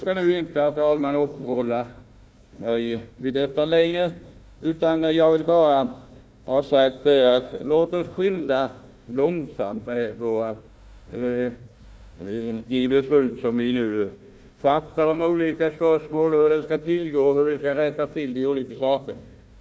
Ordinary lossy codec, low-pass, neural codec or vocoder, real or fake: none; none; codec, 16 kHz, 1 kbps, FunCodec, trained on Chinese and English, 50 frames a second; fake